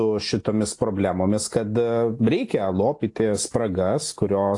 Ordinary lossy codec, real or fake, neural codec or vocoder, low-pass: AAC, 48 kbps; real; none; 10.8 kHz